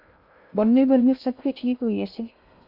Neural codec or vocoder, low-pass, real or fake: codec, 16 kHz in and 24 kHz out, 0.6 kbps, FocalCodec, streaming, 2048 codes; 5.4 kHz; fake